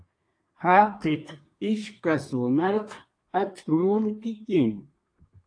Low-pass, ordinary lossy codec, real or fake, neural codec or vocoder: 9.9 kHz; AAC, 48 kbps; fake; codec, 24 kHz, 1 kbps, SNAC